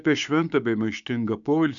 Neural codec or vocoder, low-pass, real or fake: codec, 16 kHz, 6 kbps, DAC; 7.2 kHz; fake